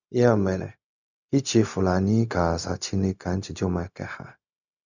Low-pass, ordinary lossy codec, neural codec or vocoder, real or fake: 7.2 kHz; none; codec, 16 kHz, 0.4 kbps, LongCat-Audio-Codec; fake